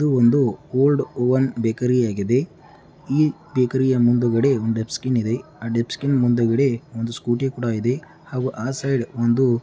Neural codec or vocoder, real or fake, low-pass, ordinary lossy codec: none; real; none; none